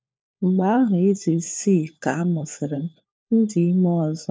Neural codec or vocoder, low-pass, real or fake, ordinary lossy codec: codec, 16 kHz, 4 kbps, FunCodec, trained on LibriTTS, 50 frames a second; none; fake; none